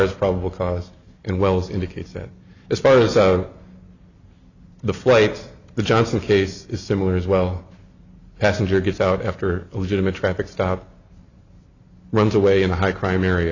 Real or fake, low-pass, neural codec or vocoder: real; 7.2 kHz; none